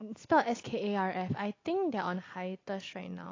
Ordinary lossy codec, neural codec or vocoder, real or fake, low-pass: AAC, 32 kbps; none; real; 7.2 kHz